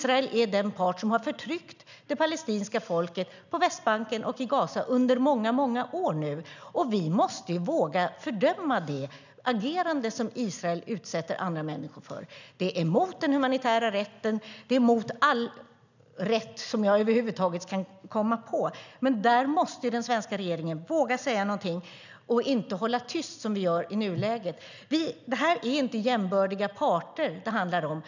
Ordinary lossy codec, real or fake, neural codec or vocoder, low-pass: none; real; none; 7.2 kHz